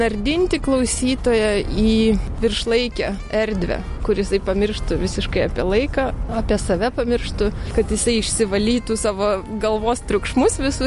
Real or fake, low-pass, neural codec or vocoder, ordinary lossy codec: real; 14.4 kHz; none; MP3, 48 kbps